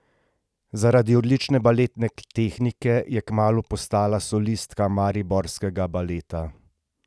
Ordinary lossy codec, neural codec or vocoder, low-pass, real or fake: none; none; none; real